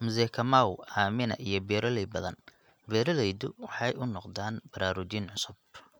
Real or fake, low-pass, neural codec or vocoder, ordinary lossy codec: real; none; none; none